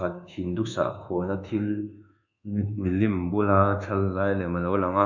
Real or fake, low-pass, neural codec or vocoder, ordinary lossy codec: fake; 7.2 kHz; codec, 24 kHz, 1.2 kbps, DualCodec; none